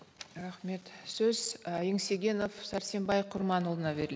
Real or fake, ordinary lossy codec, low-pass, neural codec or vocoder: real; none; none; none